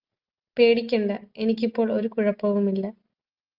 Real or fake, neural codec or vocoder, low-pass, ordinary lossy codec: real; none; 5.4 kHz; Opus, 32 kbps